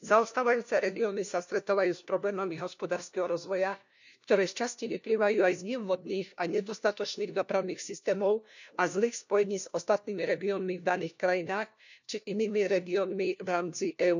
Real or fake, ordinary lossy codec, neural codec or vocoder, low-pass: fake; none; codec, 16 kHz, 1 kbps, FunCodec, trained on LibriTTS, 50 frames a second; 7.2 kHz